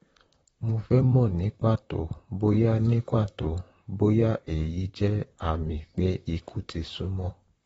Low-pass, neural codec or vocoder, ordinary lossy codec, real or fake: 19.8 kHz; vocoder, 44.1 kHz, 128 mel bands, Pupu-Vocoder; AAC, 24 kbps; fake